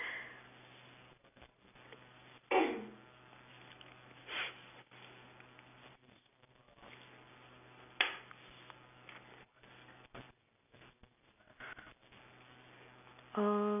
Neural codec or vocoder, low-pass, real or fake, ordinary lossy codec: none; 3.6 kHz; real; none